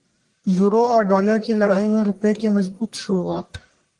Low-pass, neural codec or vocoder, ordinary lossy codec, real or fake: 10.8 kHz; codec, 44.1 kHz, 1.7 kbps, Pupu-Codec; Opus, 24 kbps; fake